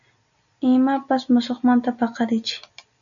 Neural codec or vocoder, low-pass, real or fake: none; 7.2 kHz; real